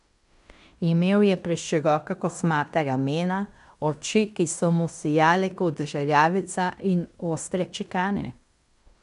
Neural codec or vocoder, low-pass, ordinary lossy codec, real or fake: codec, 16 kHz in and 24 kHz out, 0.9 kbps, LongCat-Audio-Codec, fine tuned four codebook decoder; 10.8 kHz; none; fake